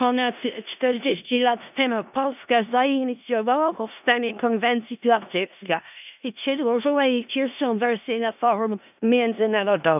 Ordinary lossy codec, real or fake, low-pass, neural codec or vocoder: none; fake; 3.6 kHz; codec, 16 kHz in and 24 kHz out, 0.4 kbps, LongCat-Audio-Codec, four codebook decoder